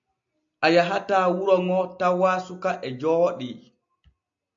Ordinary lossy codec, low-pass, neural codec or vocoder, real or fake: AAC, 64 kbps; 7.2 kHz; none; real